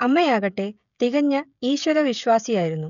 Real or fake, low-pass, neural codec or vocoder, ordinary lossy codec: fake; 7.2 kHz; codec, 16 kHz, 16 kbps, FreqCodec, smaller model; none